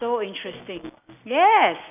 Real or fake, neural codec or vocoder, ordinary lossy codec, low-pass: real; none; none; 3.6 kHz